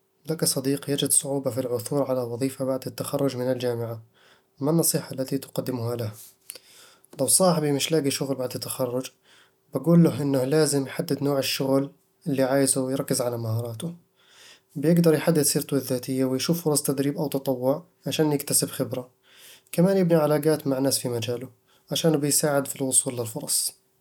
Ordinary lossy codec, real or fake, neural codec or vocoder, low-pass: none; real; none; 19.8 kHz